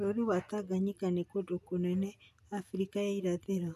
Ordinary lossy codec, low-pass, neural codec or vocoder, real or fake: none; 14.4 kHz; none; real